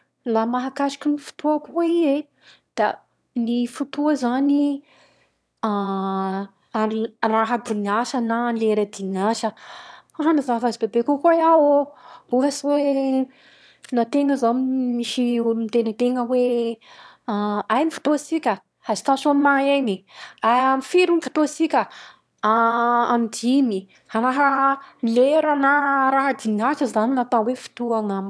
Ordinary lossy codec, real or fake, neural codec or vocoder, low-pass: none; fake; autoencoder, 22.05 kHz, a latent of 192 numbers a frame, VITS, trained on one speaker; none